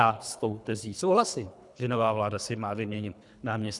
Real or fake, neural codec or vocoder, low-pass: fake; codec, 24 kHz, 3 kbps, HILCodec; 10.8 kHz